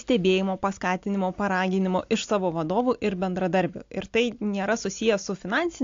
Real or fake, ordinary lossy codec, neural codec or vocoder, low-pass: real; AAC, 48 kbps; none; 7.2 kHz